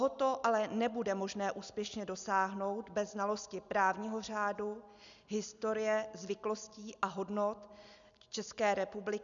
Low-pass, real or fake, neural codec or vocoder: 7.2 kHz; real; none